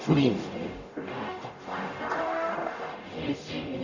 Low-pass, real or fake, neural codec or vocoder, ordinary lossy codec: 7.2 kHz; fake; codec, 44.1 kHz, 0.9 kbps, DAC; Opus, 64 kbps